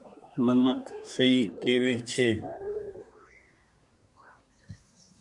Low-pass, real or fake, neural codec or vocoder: 10.8 kHz; fake; codec, 24 kHz, 1 kbps, SNAC